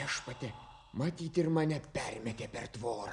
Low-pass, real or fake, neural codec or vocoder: 10.8 kHz; real; none